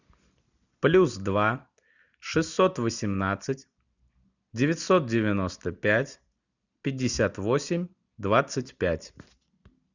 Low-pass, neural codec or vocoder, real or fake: 7.2 kHz; none; real